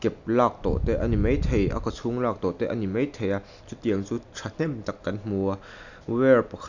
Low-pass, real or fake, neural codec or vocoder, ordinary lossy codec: 7.2 kHz; real; none; none